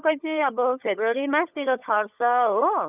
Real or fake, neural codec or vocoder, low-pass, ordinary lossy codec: fake; codec, 16 kHz, 16 kbps, FunCodec, trained on LibriTTS, 50 frames a second; 3.6 kHz; none